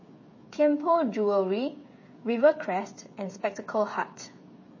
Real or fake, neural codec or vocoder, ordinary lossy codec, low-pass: fake; codec, 16 kHz, 16 kbps, FreqCodec, smaller model; MP3, 32 kbps; 7.2 kHz